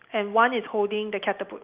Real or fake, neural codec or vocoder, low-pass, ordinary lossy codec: real; none; 3.6 kHz; Opus, 32 kbps